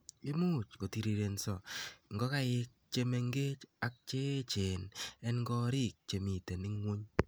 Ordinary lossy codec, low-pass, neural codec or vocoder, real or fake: none; none; none; real